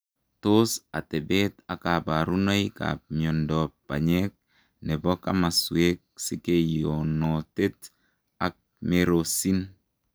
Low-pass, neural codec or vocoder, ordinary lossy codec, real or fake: none; none; none; real